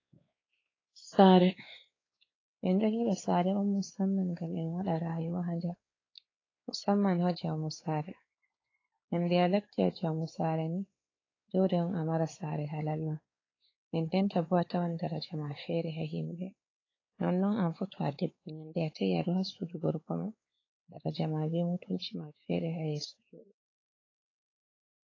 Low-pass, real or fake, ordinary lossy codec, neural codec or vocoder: 7.2 kHz; fake; AAC, 32 kbps; codec, 16 kHz, 4 kbps, X-Codec, WavLM features, trained on Multilingual LibriSpeech